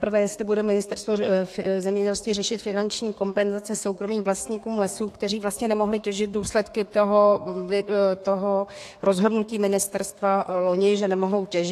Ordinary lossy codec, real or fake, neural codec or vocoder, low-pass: AAC, 64 kbps; fake; codec, 32 kHz, 1.9 kbps, SNAC; 14.4 kHz